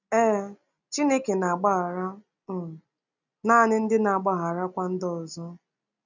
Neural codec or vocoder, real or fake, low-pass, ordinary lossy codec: none; real; 7.2 kHz; none